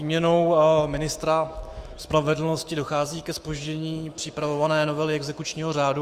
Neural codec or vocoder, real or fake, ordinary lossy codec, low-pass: autoencoder, 48 kHz, 128 numbers a frame, DAC-VAE, trained on Japanese speech; fake; Opus, 32 kbps; 14.4 kHz